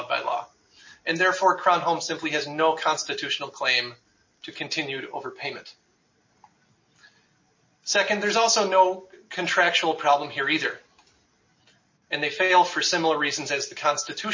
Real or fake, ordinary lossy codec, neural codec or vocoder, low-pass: real; MP3, 32 kbps; none; 7.2 kHz